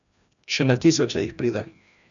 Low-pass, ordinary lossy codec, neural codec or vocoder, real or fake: 7.2 kHz; none; codec, 16 kHz, 1 kbps, FreqCodec, larger model; fake